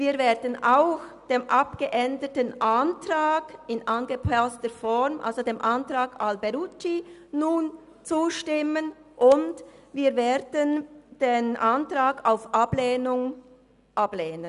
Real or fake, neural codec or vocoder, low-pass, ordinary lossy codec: real; none; 10.8 kHz; none